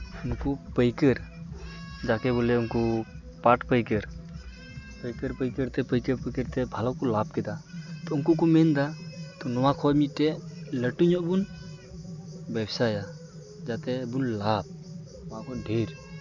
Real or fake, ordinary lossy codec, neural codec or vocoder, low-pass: real; none; none; 7.2 kHz